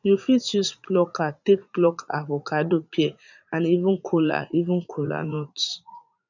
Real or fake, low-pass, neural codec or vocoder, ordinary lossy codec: fake; 7.2 kHz; vocoder, 44.1 kHz, 80 mel bands, Vocos; none